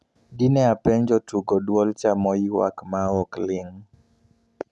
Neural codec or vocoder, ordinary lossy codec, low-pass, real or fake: none; none; none; real